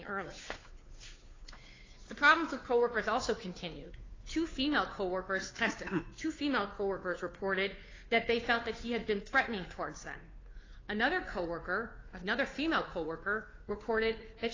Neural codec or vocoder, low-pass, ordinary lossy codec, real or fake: codec, 16 kHz, 2 kbps, FunCodec, trained on Chinese and English, 25 frames a second; 7.2 kHz; AAC, 32 kbps; fake